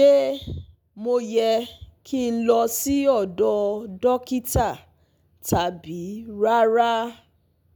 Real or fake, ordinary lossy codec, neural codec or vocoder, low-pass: real; none; none; none